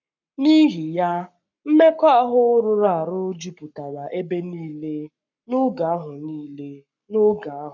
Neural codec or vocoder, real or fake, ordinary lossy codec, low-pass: codec, 44.1 kHz, 7.8 kbps, Pupu-Codec; fake; none; 7.2 kHz